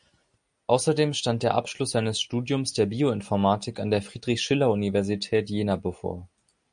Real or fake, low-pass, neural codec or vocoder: real; 9.9 kHz; none